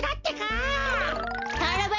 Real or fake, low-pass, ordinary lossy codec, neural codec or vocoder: real; 7.2 kHz; MP3, 64 kbps; none